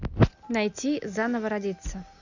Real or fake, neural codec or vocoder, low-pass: real; none; 7.2 kHz